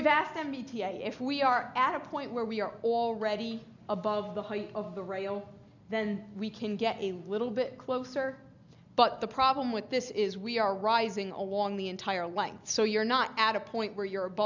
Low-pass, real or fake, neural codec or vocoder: 7.2 kHz; real; none